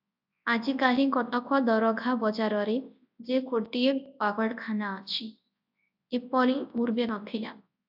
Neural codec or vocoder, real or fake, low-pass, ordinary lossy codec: codec, 24 kHz, 0.9 kbps, WavTokenizer, large speech release; fake; 5.4 kHz; AAC, 48 kbps